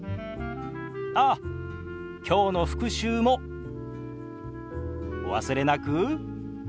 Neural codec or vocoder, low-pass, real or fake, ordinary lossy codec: none; none; real; none